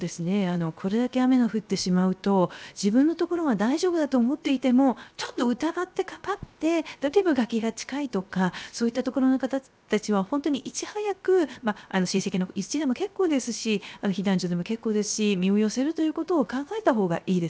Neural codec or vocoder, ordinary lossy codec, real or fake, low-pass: codec, 16 kHz, 0.7 kbps, FocalCodec; none; fake; none